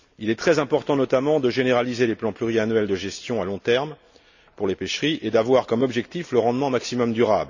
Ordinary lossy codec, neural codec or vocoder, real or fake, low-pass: none; none; real; 7.2 kHz